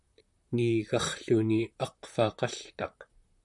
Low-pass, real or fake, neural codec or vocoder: 10.8 kHz; fake; vocoder, 44.1 kHz, 128 mel bands, Pupu-Vocoder